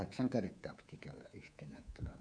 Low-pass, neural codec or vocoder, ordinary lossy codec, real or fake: 9.9 kHz; codec, 24 kHz, 3.1 kbps, DualCodec; none; fake